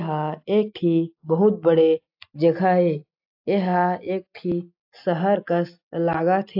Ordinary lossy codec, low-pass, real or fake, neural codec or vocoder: AAC, 48 kbps; 5.4 kHz; real; none